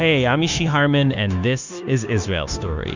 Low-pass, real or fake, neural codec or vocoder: 7.2 kHz; fake; codec, 16 kHz, 0.9 kbps, LongCat-Audio-Codec